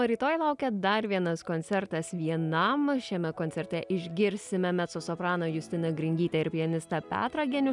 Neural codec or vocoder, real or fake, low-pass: none; real; 10.8 kHz